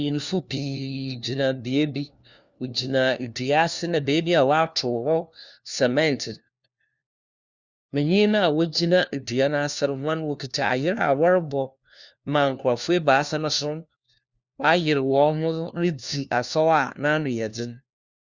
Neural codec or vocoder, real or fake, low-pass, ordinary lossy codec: codec, 16 kHz, 1 kbps, FunCodec, trained on LibriTTS, 50 frames a second; fake; 7.2 kHz; Opus, 64 kbps